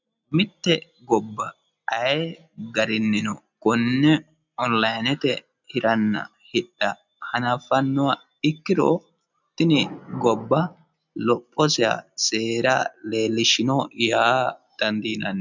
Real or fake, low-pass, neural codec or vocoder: real; 7.2 kHz; none